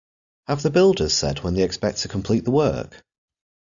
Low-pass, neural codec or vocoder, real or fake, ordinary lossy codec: 7.2 kHz; none; real; MP3, 96 kbps